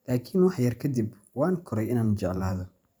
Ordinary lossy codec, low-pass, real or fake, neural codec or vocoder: none; none; fake; vocoder, 44.1 kHz, 128 mel bands every 512 samples, BigVGAN v2